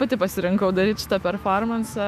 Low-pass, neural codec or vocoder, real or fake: 14.4 kHz; autoencoder, 48 kHz, 128 numbers a frame, DAC-VAE, trained on Japanese speech; fake